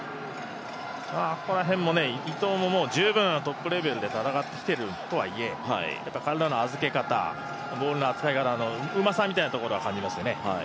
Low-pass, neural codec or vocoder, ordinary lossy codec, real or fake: none; none; none; real